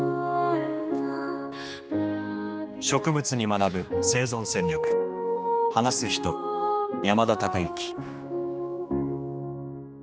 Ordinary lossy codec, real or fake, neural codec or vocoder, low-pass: none; fake; codec, 16 kHz, 2 kbps, X-Codec, HuBERT features, trained on general audio; none